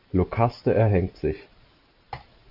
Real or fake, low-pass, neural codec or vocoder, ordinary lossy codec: real; 5.4 kHz; none; AAC, 48 kbps